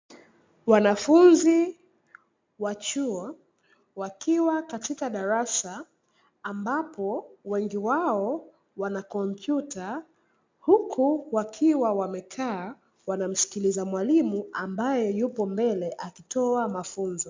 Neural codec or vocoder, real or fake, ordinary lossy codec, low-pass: none; real; MP3, 64 kbps; 7.2 kHz